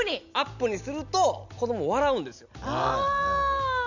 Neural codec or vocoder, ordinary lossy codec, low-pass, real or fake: none; none; 7.2 kHz; real